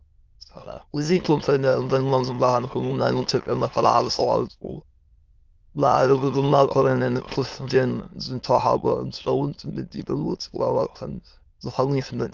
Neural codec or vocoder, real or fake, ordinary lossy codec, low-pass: autoencoder, 22.05 kHz, a latent of 192 numbers a frame, VITS, trained on many speakers; fake; Opus, 24 kbps; 7.2 kHz